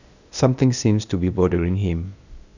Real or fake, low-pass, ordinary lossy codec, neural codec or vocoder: fake; 7.2 kHz; none; codec, 16 kHz, 0.8 kbps, ZipCodec